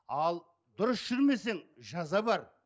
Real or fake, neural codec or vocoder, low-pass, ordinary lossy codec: real; none; none; none